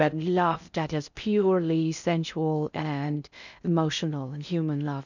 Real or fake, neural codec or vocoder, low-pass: fake; codec, 16 kHz in and 24 kHz out, 0.6 kbps, FocalCodec, streaming, 4096 codes; 7.2 kHz